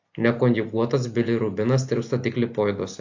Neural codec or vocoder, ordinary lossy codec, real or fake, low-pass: none; AAC, 48 kbps; real; 7.2 kHz